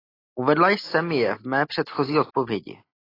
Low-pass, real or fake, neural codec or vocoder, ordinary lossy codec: 5.4 kHz; real; none; AAC, 24 kbps